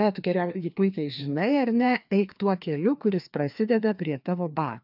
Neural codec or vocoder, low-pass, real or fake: codec, 16 kHz, 2 kbps, FreqCodec, larger model; 5.4 kHz; fake